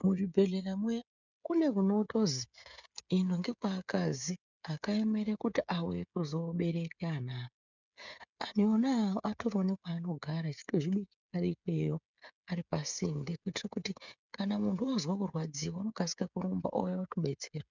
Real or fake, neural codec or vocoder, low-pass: fake; codec, 16 kHz, 16 kbps, FreqCodec, smaller model; 7.2 kHz